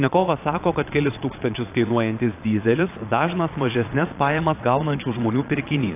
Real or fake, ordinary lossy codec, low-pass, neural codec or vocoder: fake; AAC, 24 kbps; 3.6 kHz; vocoder, 22.05 kHz, 80 mel bands, Vocos